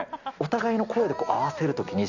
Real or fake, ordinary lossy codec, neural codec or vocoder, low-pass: real; none; none; 7.2 kHz